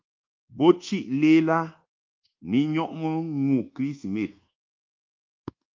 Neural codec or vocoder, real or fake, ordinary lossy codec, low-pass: codec, 24 kHz, 1.2 kbps, DualCodec; fake; Opus, 32 kbps; 7.2 kHz